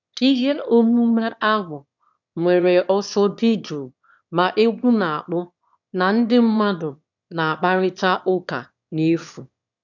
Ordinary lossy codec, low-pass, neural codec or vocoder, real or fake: none; 7.2 kHz; autoencoder, 22.05 kHz, a latent of 192 numbers a frame, VITS, trained on one speaker; fake